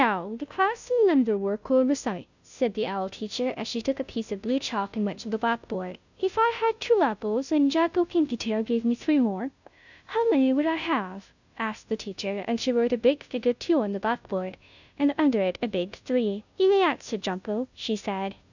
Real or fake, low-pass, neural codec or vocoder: fake; 7.2 kHz; codec, 16 kHz, 0.5 kbps, FunCodec, trained on Chinese and English, 25 frames a second